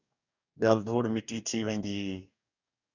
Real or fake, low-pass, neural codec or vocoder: fake; 7.2 kHz; codec, 44.1 kHz, 2.6 kbps, DAC